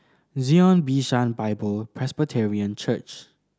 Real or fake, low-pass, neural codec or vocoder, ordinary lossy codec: real; none; none; none